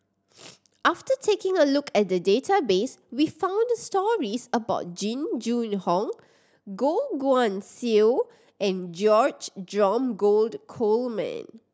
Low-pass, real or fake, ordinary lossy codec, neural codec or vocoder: none; real; none; none